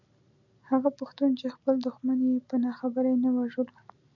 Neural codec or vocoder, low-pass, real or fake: none; 7.2 kHz; real